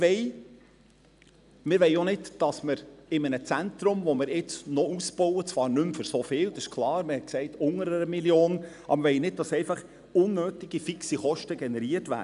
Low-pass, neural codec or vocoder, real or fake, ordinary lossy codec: 10.8 kHz; none; real; none